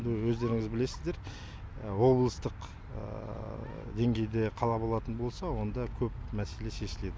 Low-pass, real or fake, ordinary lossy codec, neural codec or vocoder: none; real; none; none